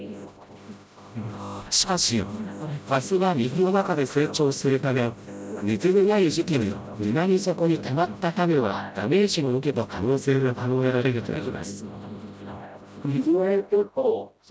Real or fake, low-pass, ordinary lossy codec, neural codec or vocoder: fake; none; none; codec, 16 kHz, 0.5 kbps, FreqCodec, smaller model